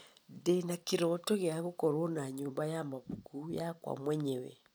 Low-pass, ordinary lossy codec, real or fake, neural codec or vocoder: none; none; real; none